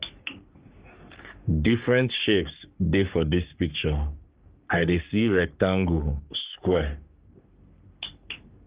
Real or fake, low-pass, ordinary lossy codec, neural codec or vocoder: fake; 3.6 kHz; Opus, 32 kbps; codec, 44.1 kHz, 3.4 kbps, Pupu-Codec